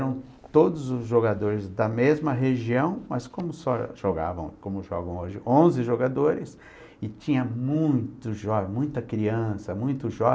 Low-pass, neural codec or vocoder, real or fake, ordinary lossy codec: none; none; real; none